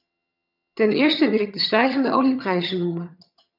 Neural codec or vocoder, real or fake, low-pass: vocoder, 22.05 kHz, 80 mel bands, HiFi-GAN; fake; 5.4 kHz